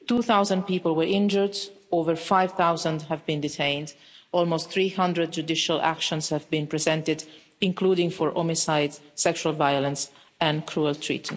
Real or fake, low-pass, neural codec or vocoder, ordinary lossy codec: real; none; none; none